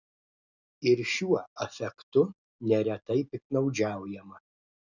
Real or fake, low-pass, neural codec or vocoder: real; 7.2 kHz; none